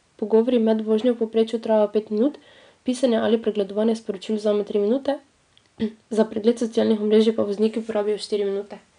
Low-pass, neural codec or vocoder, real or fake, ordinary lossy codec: 9.9 kHz; none; real; none